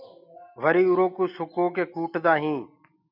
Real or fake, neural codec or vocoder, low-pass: real; none; 5.4 kHz